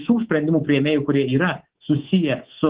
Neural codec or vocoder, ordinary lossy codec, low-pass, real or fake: codec, 24 kHz, 3.1 kbps, DualCodec; Opus, 16 kbps; 3.6 kHz; fake